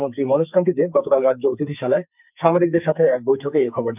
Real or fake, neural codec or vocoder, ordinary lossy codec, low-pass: fake; codec, 44.1 kHz, 2.6 kbps, SNAC; none; 3.6 kHz